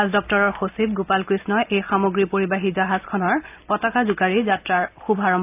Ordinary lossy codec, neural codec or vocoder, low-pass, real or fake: AAC, 32 kbps; none; 3.6 kHz; real